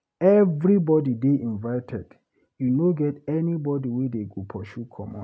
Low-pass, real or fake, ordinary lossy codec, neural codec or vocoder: none; real; none; none